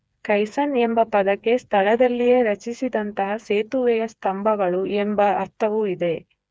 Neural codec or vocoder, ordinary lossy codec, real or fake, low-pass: codec, 16 kHz, 4 kbps, FreqCodec, smaller model; none; fake; none